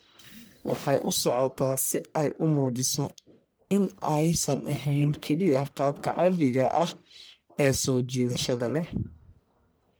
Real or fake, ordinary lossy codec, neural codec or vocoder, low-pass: fake; none; codec, 44.1 kHz, 1.7 kbps, Pupu-Codec; none